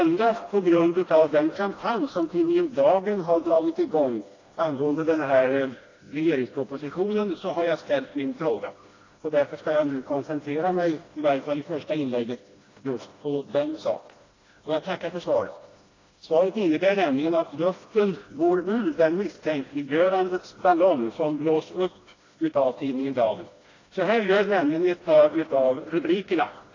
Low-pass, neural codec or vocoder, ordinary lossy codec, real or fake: 7.2 kHz; codec, 16 kHz, 1 kbps, FreqCodec, smaller model; AAC, 32 kbps; fake